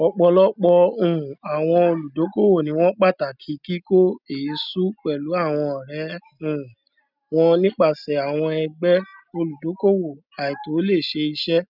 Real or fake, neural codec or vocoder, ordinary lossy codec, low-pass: real; none; none; 5.4 kHz